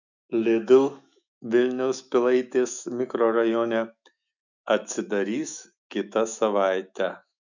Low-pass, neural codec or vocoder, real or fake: 7.2 kHz; autoencoder, 48 kHz, 128 numbers a frame, DAC-VAE, trained on Japanese speech; fake